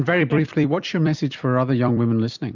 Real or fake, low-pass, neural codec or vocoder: fake; 7.2 kHz; vocoder, 44.1 kHz, 128 mel bands every 256 samples, BigVGAN v2